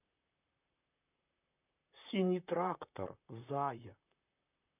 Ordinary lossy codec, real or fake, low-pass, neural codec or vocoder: none; fake; 3.6 kHz; vocoder, 44.1 kHz, 128 mel bands, Pupu-Vocoder